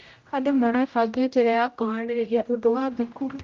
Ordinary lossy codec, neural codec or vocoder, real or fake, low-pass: Opus, 24 kbps; codec, 16 kHz, 0.5 kbps, X-Codec, HuBERT features, trained on general audio; fake; 7.2 kHz